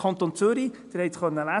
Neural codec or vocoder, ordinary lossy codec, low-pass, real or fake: none; none; 10.8 kHz; real